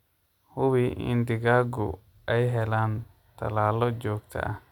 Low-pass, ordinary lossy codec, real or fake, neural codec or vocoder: 19.8 kHz; none; real; none